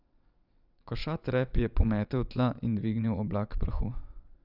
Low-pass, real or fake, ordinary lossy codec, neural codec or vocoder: 5.4 kHz; fake; none; vocoder, 22.05 kHz, 80 mel bands, WaveNeXt